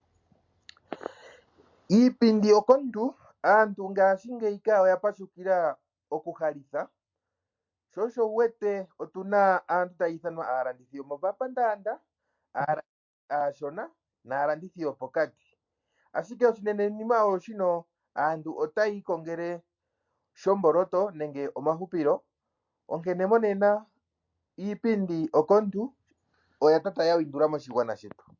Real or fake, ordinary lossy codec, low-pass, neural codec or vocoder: real; MP3, 48 kbps; 7.2 kHz; none